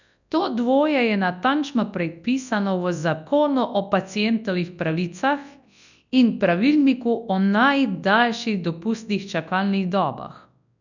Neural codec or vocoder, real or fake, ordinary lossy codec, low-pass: codec, 24 kHz, 0.9 kbps, WavTokenizer, large speech release; fake; none; 7.2 kHz